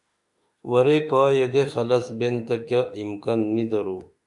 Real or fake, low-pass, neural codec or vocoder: fake; 10.8 kHz; autoencoder, 48 kHz, 32 numbers a frame, DAC-VAE, trained on Japanese speech